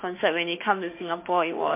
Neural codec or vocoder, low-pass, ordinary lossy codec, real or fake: autoencoder, 48 kHz, 32 numbers a frame, DAC-VAE, trained on Japanese speech; 3.6 kHz; MP3, 24 kbps; fake